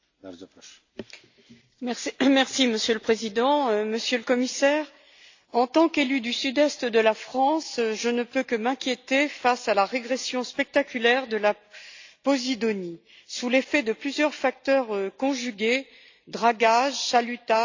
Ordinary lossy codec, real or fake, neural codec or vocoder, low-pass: AAC, 48 kbps; real; none; 7.2 kHz